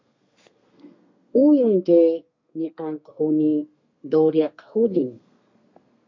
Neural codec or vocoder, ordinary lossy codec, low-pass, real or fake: codec, 32 kHz, 1.9 kbps, SNAC; MP3, 48 kbps; 7.2 kHz; fake